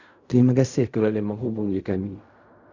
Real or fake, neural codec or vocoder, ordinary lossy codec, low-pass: fake; codec, 16 kHz in and 24 kHz out, 0.4 kbps, LongCat-Audio-Codec, fine tuned four codebook decoder; Opus, 64 kbps; 7.2 kHz